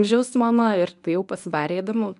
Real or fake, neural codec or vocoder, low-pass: fake; codec, 24 kHz, 0.9 kbps, WavTokenizer, medium speech release version 1; 10.8 kHz